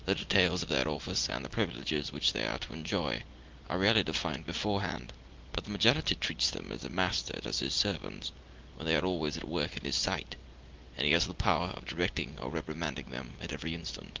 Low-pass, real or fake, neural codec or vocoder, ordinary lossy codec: 7.2 kHz; real; none; Opus, 32 kbps